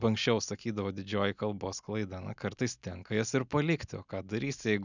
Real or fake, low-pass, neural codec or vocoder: real; 7.2 kHz; none